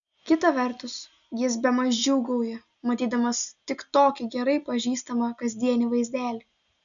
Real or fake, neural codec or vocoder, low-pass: real; none; 7.2 kHz